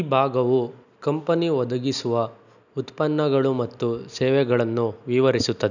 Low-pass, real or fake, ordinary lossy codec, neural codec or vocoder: 7.2 kHz; real; none; none